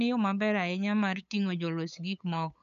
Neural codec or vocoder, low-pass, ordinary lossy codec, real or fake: codec, 16 kHz, 8 kbps, FunCodec, trained on LibriTTS, 25 frames a second; 7.2 kHz; none; fake